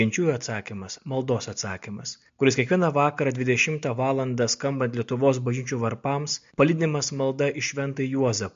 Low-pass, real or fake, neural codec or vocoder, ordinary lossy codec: 7.2 kHz; real; none; MP3, 48 kbps